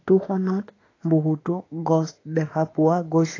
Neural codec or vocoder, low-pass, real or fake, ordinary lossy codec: autoencoder, 48 kHz, 32 numbers a frame, DAC-VAE, trained on Japanese speech; 7.2 kHz; fake; AAC, 32 kbps